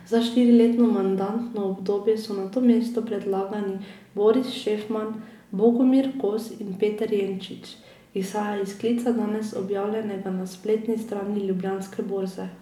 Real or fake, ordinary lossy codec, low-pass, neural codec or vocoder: real; none; 19.8 kHz; none